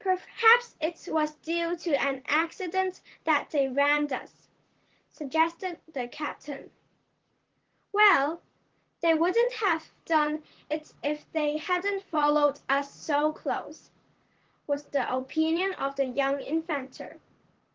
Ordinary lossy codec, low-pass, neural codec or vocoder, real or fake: Opus, 16 kbps; 7.2 kHz; vocoder, 44.1 kHz, 128 mel bands, Pupu-Vocoder; fake